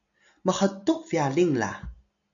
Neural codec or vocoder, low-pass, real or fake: none; 7.2 kHz; real